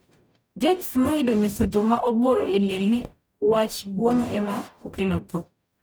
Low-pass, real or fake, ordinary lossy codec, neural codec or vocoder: none; fake; none; codec, 44.1 kHz, 0.9 kbps, DAC